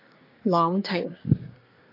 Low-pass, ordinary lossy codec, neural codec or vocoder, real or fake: 5.4 kHz; AAC, 32 kbps; codec, 24 kHz, 1 kbps, SNAC; fake